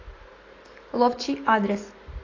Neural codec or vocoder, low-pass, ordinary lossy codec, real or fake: none; 7.2 kHz; AAC, 32 kbps; real